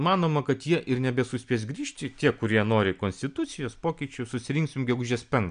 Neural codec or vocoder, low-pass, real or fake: none; 9.9 kHz; real